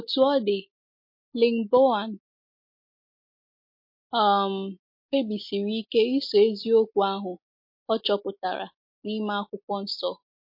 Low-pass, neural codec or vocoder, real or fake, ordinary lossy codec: 5.4 kHz; none; real; MP3, 32 kbps